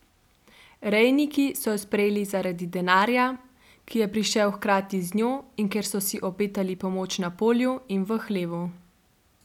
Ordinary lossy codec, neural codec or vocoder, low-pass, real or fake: none; none; 19.8 kHz; real